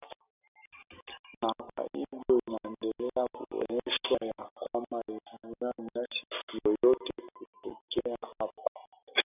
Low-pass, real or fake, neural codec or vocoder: 3.6 kHz; real; none